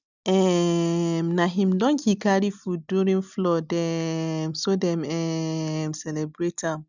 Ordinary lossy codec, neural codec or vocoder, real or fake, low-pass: none; none; real; 7.2 kHz